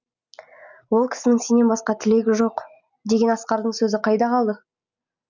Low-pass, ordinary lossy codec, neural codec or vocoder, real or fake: 7.2 kHz; none; none; real